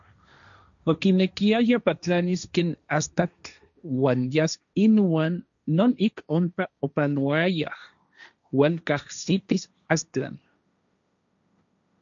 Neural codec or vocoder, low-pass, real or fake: codec, 16 kHz, 1.1 kbps, Voila-Tokenizer; 7.2 kHz; fake